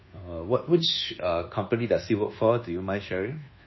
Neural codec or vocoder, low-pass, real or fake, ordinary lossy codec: codec, 24 kHz, 1.2 kbps, DualCodec; 7.2 kHz; fake; MP3, 24 kbps